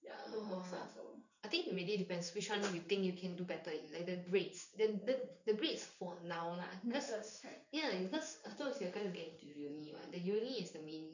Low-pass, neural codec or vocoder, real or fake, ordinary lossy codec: 7.2 kHz; codec, 16 kHz in and 24 kHz out, 1 kbps, XY-Tokenizer; fake; none